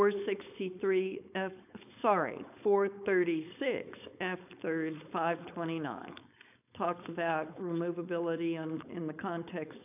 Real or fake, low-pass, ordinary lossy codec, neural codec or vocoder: fake; 3.6 kHz; AAC, 32 kbps; codec, 16 kHz, 4.8 kbps, FACodec